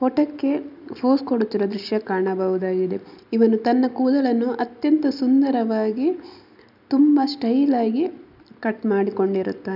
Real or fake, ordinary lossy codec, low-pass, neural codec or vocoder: real; none; 5.4 kHz; none